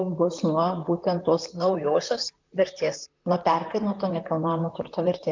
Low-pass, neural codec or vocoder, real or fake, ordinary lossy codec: 7.2 kHz; vocoder, 44.1 kHz, 128 mel bands, Pupu-Vocoder; fake; MP3, 64 kbps